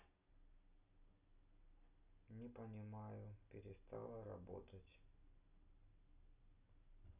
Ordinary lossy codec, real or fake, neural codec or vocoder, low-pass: none; real; none; 3.6 kHz